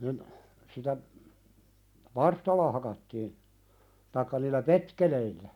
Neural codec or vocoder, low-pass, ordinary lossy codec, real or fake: none; 19.8 kHz; none; real